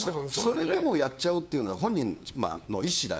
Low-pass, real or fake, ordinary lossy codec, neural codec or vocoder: none; fake; none; codec, 16 kHz, 2 kbps, FunCodec, trained on LibriTTS, 25 frames a second